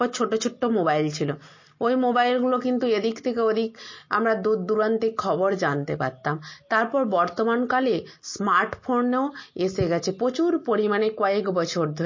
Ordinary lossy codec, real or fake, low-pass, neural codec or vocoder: MP3, 32 kbps; real; 7.2 kHz; none